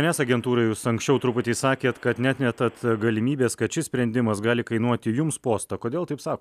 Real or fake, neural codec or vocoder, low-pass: real; none; 14.4 kHz